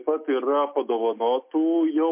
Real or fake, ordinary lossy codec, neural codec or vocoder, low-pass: real; MP3, 32 kbps; none; 3.6 kHz